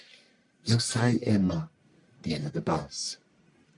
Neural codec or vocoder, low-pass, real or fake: codec, 44.1 kHz, 1.7 kbps, Pupu-Codec; 10.8 kHz; fake